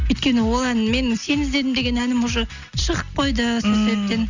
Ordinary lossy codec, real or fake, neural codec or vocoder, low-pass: none; real; none; 7.2 kHz